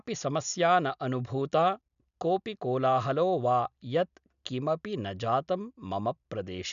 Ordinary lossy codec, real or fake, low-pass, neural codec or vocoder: none; real; 7.2 kHz; none